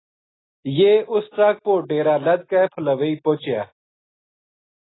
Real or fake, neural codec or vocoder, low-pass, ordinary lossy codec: real; none; 7.2 kHz; AAC, 16 kbps